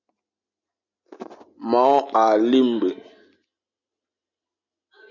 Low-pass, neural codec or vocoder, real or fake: 7.2 kHz; none; real